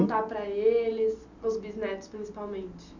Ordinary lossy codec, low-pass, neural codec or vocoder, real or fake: none; 7.2 kHz; none; real